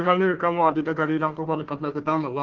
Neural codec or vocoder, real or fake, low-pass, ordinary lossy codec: codec, 16 kHz, 1 kbps, FunCodec, trained on Chinese and English, 50 frames a second; fake; 7.2 kHz; Opus, 16 kbps